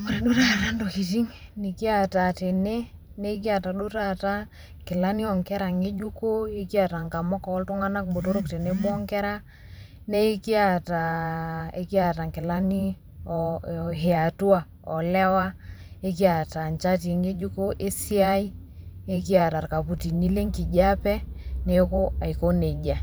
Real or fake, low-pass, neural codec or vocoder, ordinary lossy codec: fake; none; vocoder, 44.1 kHz, 128 mel bands every 512 samples, BigVGAN v2; none